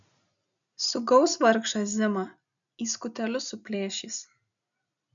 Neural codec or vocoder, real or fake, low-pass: none; real; 7.2 kHz